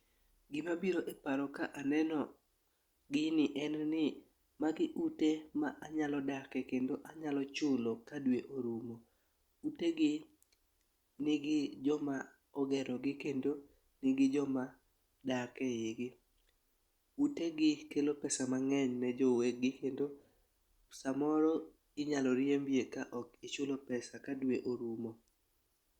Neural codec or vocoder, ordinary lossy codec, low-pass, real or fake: none; Opus, 64 kbps; 19.8 kHz; real